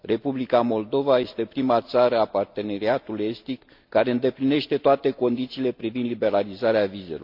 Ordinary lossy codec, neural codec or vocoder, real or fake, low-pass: MP3, 48 kbps; none; real; 5.4 kHz